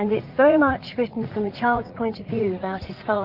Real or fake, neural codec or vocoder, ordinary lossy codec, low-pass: fake; vocoder, 44.1 kHz, 80 mel bands, Vocos; Opus, 32 kbps; 5.4 kHz